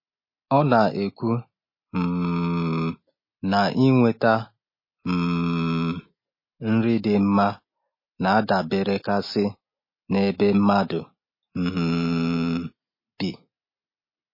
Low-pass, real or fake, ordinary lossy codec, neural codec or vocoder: 5.4 kHz; real; MP3, 24 kbps; none